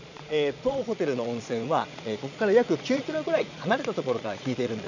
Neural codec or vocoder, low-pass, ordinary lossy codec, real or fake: vocoder, 22.05 kHz, 80 mel bands, Vocos; 7.2 kHz; none; fake